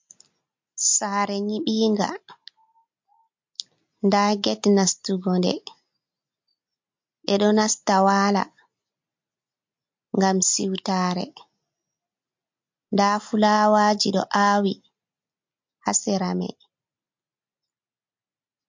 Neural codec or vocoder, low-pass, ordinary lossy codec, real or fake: none; 7.2 kHz; MP3, 48 kbps; real